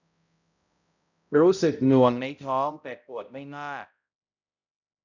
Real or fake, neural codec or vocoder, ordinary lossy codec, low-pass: fake; codec, 16 kHz, 0.5 kbps, X-Codec, HuBERT features, trained on balanced general audio; none; 7.2 kHz